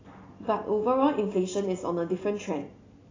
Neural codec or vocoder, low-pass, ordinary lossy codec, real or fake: none; 7.2 kHz; AAC, 32 kbps; real